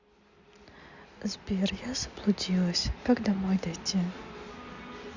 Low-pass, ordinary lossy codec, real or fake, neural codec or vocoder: 7.2 kHz; Opus, 64 kbps; real; none